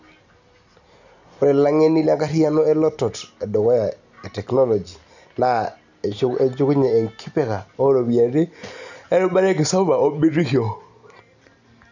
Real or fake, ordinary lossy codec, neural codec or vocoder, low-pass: real; none; none; 7.2 kHz